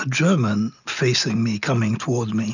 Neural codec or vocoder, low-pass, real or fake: none; 7.2 kHz; real